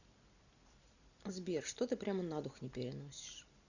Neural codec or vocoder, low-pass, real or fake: none; 7.2 kHz; real